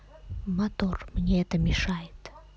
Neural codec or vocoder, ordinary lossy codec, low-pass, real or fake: none; none; none; real